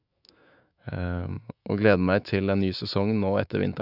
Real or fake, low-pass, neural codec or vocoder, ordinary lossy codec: fake; 5.4 kHz; autoencoder, 48 kHz, 128 numbers a frame, DAC-VAE, trained on Japanese speech; none